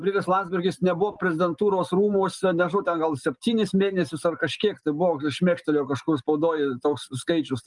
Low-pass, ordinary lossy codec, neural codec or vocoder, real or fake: 10.8 kHz; Opus, 32 kbps; none; real